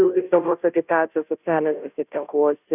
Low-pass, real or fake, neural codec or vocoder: 3.6 kHz; fake; codec, 16 kHz, 0.5 kbps, FunCodec, trained on Chinese and English, 25 frames a second